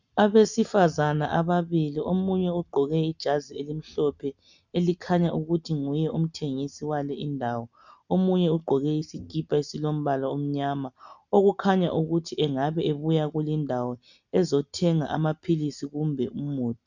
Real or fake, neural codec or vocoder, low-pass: real; none; 7.2 kHz